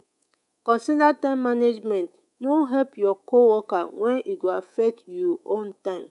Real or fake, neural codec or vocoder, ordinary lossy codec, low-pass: fake; codec, 24 kHz, 3.1 kbps, DualCodec; none; 10.8 kHz